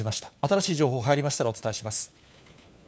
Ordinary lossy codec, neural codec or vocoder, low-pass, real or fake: none; codec, 16 kHz, 4 kbps, FunCodec, trained on LibriTTS, 50 frames a second; none; fake